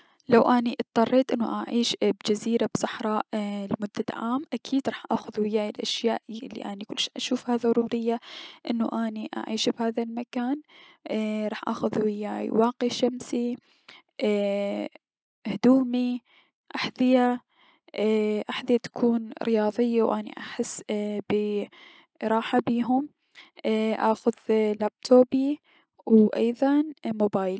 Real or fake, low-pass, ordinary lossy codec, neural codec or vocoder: real; none; none; none